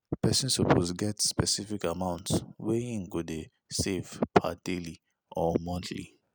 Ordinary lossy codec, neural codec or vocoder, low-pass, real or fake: none; none; none; real